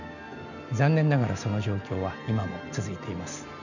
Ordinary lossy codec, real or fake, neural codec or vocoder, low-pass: none; real; none; 7.2 kHz